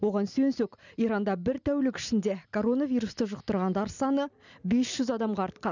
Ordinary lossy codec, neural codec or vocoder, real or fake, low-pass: none; none; real; 7.2 kHz